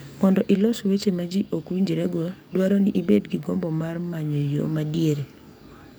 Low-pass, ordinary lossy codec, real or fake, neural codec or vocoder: none; none; fake; codec, 44.1 kHz, 7.8 kbps, DAC